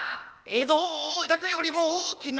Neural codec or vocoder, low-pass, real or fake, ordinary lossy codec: codec, 16 kHz, 0.8 kbps, ZipCodec; none; fake; none